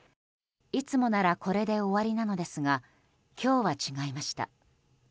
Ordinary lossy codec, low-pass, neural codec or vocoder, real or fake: none; none; none; real